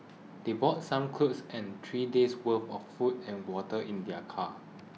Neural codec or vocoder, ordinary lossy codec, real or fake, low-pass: none; none; real; none